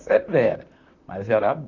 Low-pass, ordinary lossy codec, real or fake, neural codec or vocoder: 7.2 kHz; none; fake; vocoder, 44.1 kHz, 128 mel bands, Pupu-Vocoder